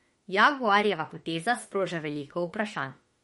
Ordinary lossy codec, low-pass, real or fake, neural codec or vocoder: MP3, 48 kbps; 19.8 kHz; fake; autoencoder, 48 kHz, 32 numbers a frame, DAC-VAE, trained on Japanese speech